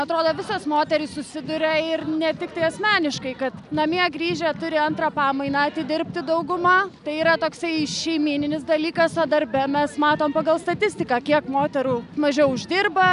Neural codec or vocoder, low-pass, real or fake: none; 10.8 kHz; real